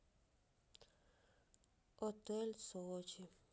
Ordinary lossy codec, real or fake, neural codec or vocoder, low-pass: none; real; none; none